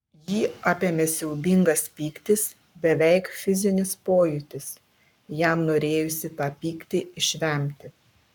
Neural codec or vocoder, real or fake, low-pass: codec, 44.1 kHz, 7.8 kbps, Pupu-Codec; fake; 19.8 kHz